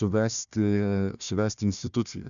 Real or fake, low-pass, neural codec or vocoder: fake; 7.2 kHz; codec, 16 kHz, 1 kbps, FunCodec, trained on Chinese and English, 50 frames a second